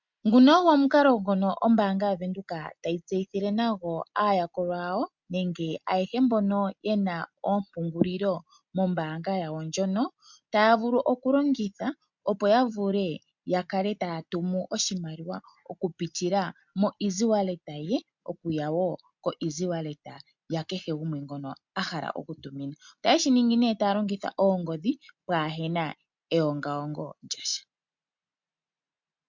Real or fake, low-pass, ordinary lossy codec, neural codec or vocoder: real; 7.2 kHz; MP3, 64 kbps; none